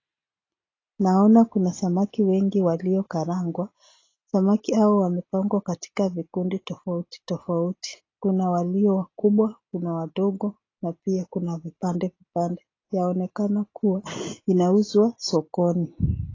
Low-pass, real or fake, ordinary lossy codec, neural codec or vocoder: 7.2 kHz; real; AAC, 32 kbps; none